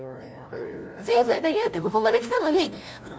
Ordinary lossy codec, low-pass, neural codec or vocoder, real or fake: none; none; codec, 16 kHz, 0.5 kbps, FunCodec, trained on LibriTTS, 25 frames a second; fake